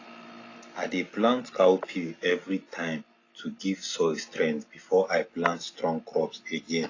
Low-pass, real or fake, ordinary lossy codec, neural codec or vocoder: 7.2 kHz; real; AAC, 32 kbps; none